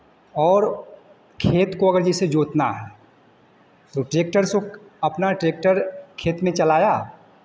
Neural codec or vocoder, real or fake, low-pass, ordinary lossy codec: none; real; none; none